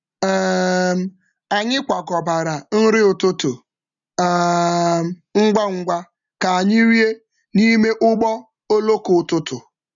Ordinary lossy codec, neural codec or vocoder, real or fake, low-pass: none; none; real; 7.2 kHz